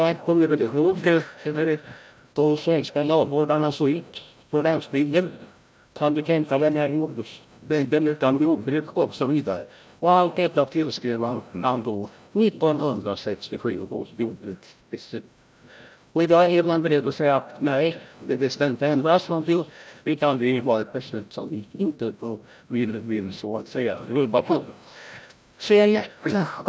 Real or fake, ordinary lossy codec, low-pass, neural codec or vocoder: fake; none; none; codec, 16 kHz, 0.5 kbps, FreqCodec, larger model